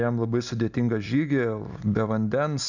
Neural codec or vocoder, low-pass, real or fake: none; 7.2 kHz; real